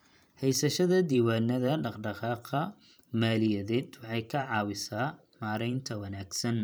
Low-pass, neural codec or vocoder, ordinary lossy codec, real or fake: none; vocoder, 44.1 kHz, 128 mel bands every 512 samples, BigVGAN v2; none; fake